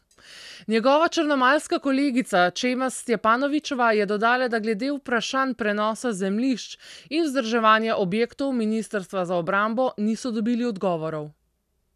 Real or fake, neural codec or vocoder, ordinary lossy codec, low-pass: real; none; none; 14.4 kHz